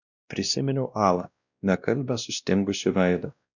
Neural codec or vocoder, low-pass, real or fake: codec, 16 kHz, 1 kbps, X-Codec, WavLM features, trained on Multilingual LibriSpeech; 7.2 kHz; fake